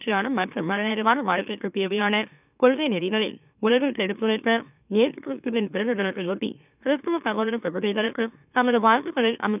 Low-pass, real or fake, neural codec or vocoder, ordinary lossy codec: 3.6 kHz; fake; autoencoder, 44.1 kHz, a latent of 192 numbers a frame, MeloTTS; none